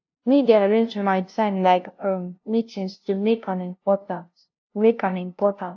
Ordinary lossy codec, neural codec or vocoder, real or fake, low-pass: AAC, 48 kbps; codec, 16 kHz, 0.5 kbps, FunCodec, trained on LibriTTS, 25 frames a second; fake; 7.2 kHz